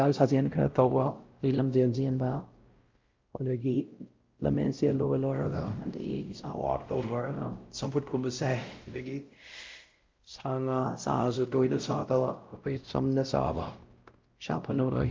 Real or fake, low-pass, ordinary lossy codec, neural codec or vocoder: fake; 7.2 kHz; Opus, 24 kbps; codec, 16 kHz, 0.5 kbps, X-Codec, WavLM features, trained on Multilingual LibriSpeech